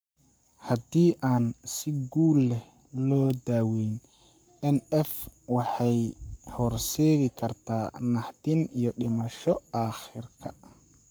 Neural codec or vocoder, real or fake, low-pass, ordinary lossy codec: codec, 44.1 kHz, 7.8 kbps, Pupu-Codec; fake; none; none